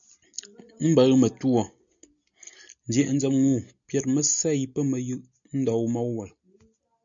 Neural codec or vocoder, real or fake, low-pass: none; real; 7.2 kHz